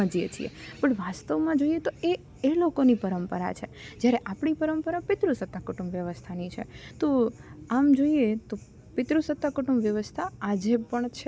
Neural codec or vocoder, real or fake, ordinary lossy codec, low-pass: none; real; none; none